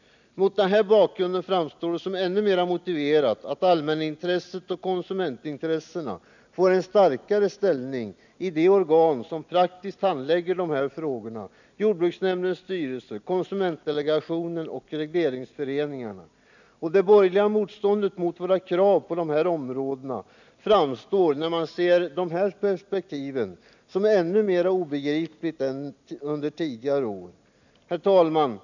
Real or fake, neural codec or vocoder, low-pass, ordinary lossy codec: real; none; 7.2 kHz; none